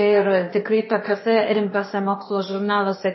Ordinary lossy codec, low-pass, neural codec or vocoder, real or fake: MP3, 24 kbps; 7.2 kHz; codec, 16 kHz, 0.8 kbps, ZipCodec; fake